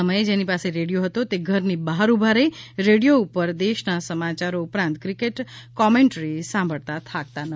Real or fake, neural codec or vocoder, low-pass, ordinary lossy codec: real; none; none; none